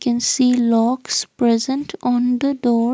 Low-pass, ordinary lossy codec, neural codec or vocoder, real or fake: none; none; none; real